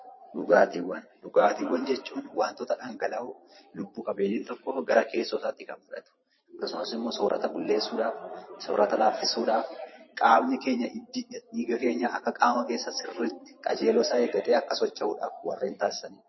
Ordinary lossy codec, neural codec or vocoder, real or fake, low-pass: MP3, 24 kbps; vocoder, 22.05 kHz, 80 mel bands, Vocos; fake; 7.2 kHz